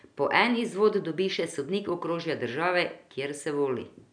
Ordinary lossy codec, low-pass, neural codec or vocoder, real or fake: none; 9.9 kHz; none; real